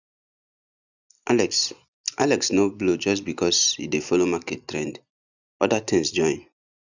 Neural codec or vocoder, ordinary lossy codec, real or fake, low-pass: none; none; real; 7.2 kHz